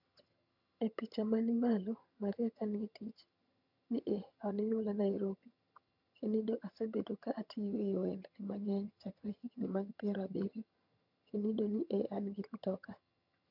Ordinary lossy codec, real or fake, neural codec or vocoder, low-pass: none; fake; vocoder, 22.05 kHz, 80 mel bands, HiFi-GAN; 5.4 kHz